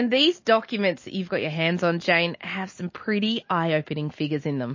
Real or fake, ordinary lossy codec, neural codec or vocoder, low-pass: real; MP3, 32 kbps; none; 7.2 kHz